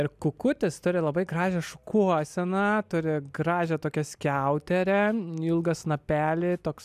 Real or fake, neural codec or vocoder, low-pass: real; none; 14.4 kHz